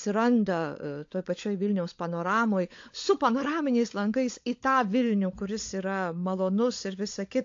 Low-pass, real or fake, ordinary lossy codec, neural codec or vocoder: 7.2 kHz; fake; AAC, 48 kbps; codec, 16 kHz, 16 kbps, FunCodec, trained on LibriTTS, 50 frames a second